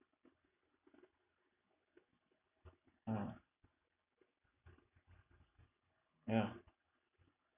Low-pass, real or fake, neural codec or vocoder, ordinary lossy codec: 3.6 kHz; real; none; none